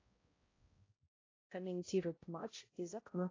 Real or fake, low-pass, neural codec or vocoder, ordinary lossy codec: fake; 7.2 kHz; codec, 16 kHz, 0.5 kbps, X-Codec, HuBERT features, trained on balanced general audio; AAC, 32 kbps